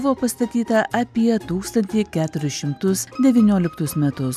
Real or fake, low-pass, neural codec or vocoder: real; 14.4 kHz; none